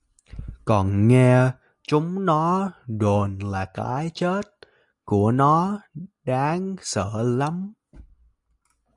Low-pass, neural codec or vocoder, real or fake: 10.8 kHz; none; real